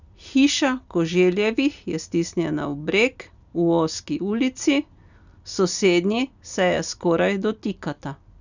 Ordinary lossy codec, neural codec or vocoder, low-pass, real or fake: none; none; 7.2 kHz; real